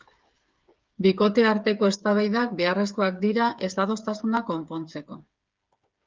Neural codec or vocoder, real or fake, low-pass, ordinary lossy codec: codec, 16 kHz, 8 kbps, FreqCodec, smaller model; fake; 7.2 kHz; Opus, 24 kbps